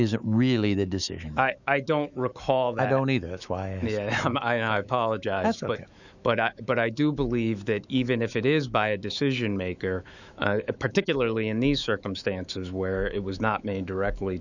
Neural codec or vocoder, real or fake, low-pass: autoencoder, 48 kHz, 128 numbers a frame, DAC-VAE, trained on Japanese speech; fake; 7.2 kHz